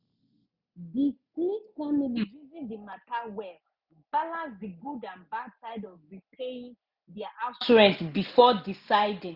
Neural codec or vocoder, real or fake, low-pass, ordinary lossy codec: none; real; 5.4 kHz; none